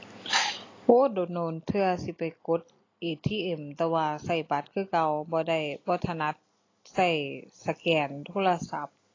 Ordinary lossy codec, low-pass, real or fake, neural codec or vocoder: AAC, 32 kbps; 7.2 kHz; real; none